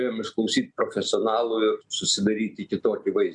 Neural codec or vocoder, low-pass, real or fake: none; 10.8 kHz; real